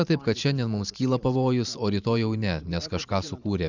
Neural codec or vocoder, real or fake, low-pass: vocoder, 44.1 kHz, 128 mel bands every 512 samples, BigVGAN v2; fake; 7.2 kHz